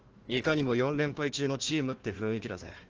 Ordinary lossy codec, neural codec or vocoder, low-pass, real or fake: Opus, 16 kbps; codec, 16 kHz, 1 kbps, FunCodec, trained on Chinese and English, 50 frames a second; 7.2 kHz; fake